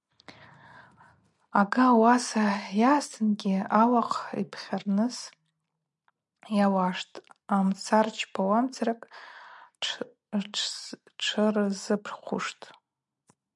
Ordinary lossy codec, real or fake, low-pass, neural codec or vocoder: MP3, 96 kbps; real; 10.8 kHz; none